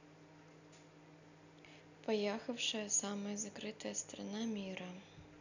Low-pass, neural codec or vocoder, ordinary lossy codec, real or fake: 7.2 kHz; none; none; real